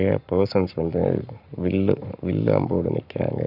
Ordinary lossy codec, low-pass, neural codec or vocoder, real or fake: AAC, 48 kbps; 5.4 kHz; codec, 44.1 kHz, 7.8 kbps, Pupu-Codec; fake